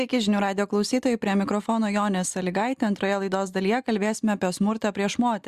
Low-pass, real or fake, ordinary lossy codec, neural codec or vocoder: 14.4 kHz; real; Opus, 64 kbps; none